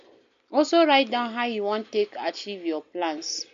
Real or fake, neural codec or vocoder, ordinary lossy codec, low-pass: real; none; MP3, 48 kbps; 7.2 kHz